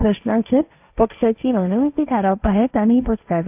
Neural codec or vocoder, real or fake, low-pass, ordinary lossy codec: codec, 16 kHz, 1.1 kbps, Voila-Tokenizer; fake; 3.6 kHz; AAC, 32 kbps